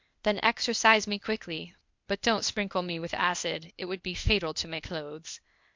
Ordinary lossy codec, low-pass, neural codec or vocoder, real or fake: MP3, 64 kbps; 7.2 kHz; codec, 16 kHz, 0.8 kbps, ZipCodec; fake